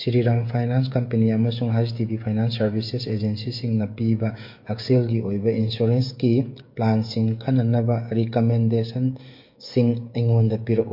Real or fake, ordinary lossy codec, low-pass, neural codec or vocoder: fake; MP3, 32 kbps; 5.4 kHz; codec, 16 kHz, 16 kbps, FreqCodec, smaller model